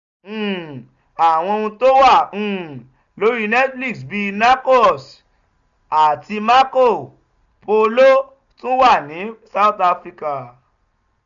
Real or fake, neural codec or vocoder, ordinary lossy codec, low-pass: real; none; none; 7.2 kHz